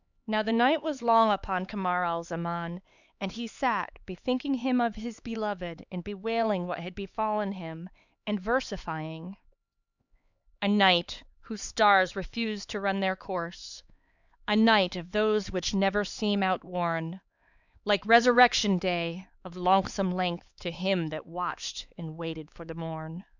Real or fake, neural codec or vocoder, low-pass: fake; codec, 16 kHz, 4 kbps, X-Codec, HuBERT features, trained on LibriSpeech; 7.2 kHz